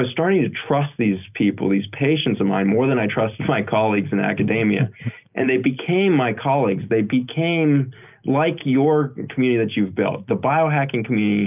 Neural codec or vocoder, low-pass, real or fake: none; 3.6 kHz; real